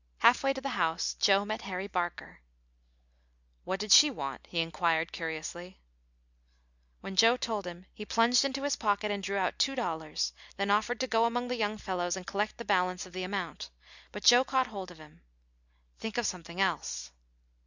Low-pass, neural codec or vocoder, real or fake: 7.2 kHz; none; real